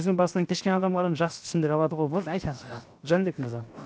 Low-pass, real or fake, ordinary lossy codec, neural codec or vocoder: none; fake; none; codec, 16 kHz, 0.7 kbps, FocalCodec